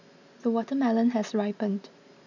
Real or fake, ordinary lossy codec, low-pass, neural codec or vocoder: real; none; 7.2 kHz; none